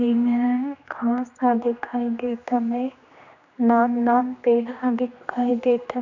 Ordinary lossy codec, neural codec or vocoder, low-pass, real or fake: none; codec, 16 kHz, 2 kbps, X-Codec, HuBERT features, trained on general audio; 7.2 kHz; fake